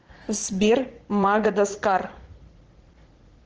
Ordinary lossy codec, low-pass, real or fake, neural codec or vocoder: Opus, 16 kbps; 7.2 kHz; fake; vocoder, 44.1 kHz, 80 mel bands, Vocos